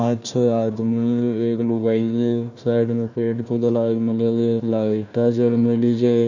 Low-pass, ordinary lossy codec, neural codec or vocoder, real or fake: 7.2 kHz; none; codec, 16 kHz, 1 kbps, FunCodec, trained on Chinese and English, 50 frames a second; fake